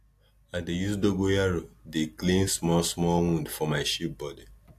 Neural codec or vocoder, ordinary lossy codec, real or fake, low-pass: none; AAC, 64 kbps; real; 14.4 kHz